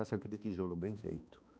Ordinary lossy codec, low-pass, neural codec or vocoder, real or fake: none; none; codec, 16 kHz, 2 kbps, X-Codec, HuBERT features, trained on balanced general audio; fake